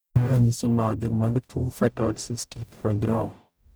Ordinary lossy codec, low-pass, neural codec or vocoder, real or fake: none; none; codec, 44.1 kHz, 0.9 kbps, DAC; fake